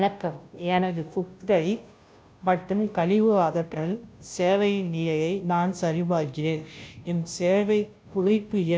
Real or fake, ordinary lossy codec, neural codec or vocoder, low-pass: fake; none; codec, 16 kHz, 0.5 kbps, FunCodec, trained on Chinese and English, 25 frames a second; none